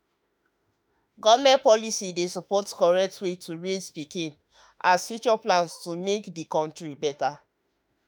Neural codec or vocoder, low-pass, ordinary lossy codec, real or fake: autoencoder, 48 kHz, 32 numbers a frame, DAC-VAE, trained on Japanese speech; none; none; fake